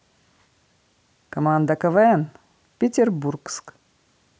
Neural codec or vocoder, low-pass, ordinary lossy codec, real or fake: none; none; none; real